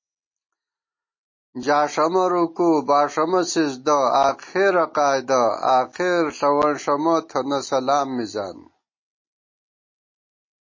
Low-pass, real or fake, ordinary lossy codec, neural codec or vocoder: 7.2 kHz; real; MP3, 32 kbps; none